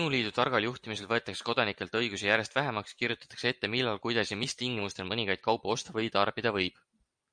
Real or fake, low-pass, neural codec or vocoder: real; 9.9 kHz; none